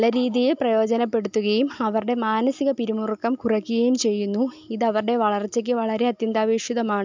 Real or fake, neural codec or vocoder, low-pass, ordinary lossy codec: real; none; 7.2 kHz; MP3, 64 kbps